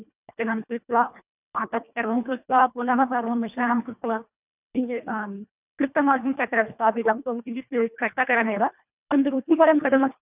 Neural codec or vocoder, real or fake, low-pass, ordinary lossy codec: codec, 24 kHz, 1.5 kbps, HILCodec; fake; 3.6 kHz; none